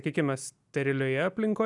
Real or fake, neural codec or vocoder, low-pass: real; none; 10.8 kHz